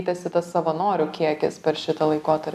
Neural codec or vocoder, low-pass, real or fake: none; 14.4 kHz; real